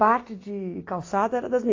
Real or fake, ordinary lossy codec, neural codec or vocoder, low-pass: real; AAC, 32 kbps; none; 7.2 kHz